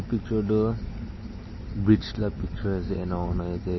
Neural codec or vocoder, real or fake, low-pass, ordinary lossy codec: none; real; 7.2 kHz; MP3, 24 kbps